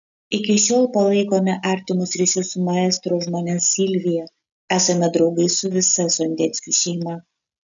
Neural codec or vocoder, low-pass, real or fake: none; 7.2 kHz; real